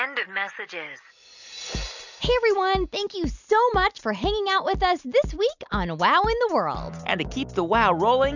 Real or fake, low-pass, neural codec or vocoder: real; 7.2 kHz; none